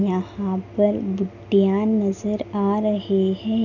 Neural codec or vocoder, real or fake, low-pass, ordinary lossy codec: none; real; 7.2 kHz; none